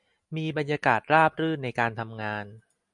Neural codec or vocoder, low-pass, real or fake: none; 10.8 kHz; real